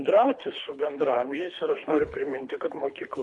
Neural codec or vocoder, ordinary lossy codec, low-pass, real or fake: codec, 24 kHz, 3 kbps, HILCodec; MP3, 64 kbps; 10.8 kHz; fake